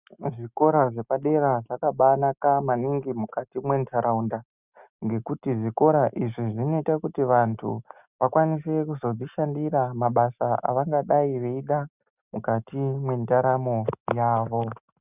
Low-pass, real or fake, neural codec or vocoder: 3.6 kHz; real; none